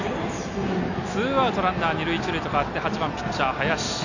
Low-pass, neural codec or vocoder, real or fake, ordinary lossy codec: 7.2 kHz; none; real; none